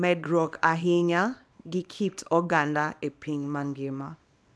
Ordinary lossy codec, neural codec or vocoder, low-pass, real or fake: none; codec, 24 kHz, 0.9 kbps, WavTokenizer, small release; none; fake